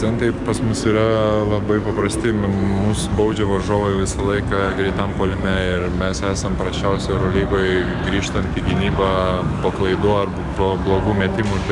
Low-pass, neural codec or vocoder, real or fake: 10.8 kHz; codec, 44.1 kHz, 7.8 kbps, DAC; fake